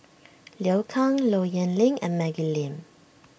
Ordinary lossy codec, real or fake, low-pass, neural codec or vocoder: none; real; none; none